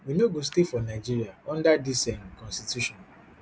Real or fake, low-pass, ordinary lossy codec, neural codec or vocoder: real; none; none; none